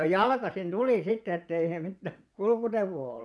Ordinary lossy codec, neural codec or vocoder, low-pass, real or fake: none; vocoder, 22.05 kHz, 80 mel bands, WaveNeXt; none; fake